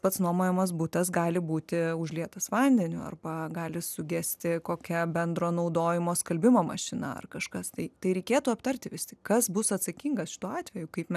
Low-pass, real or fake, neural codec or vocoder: 14.4 kHz; real; none